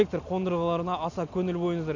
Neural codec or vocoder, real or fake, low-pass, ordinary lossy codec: none; real; 7.2 kHz; none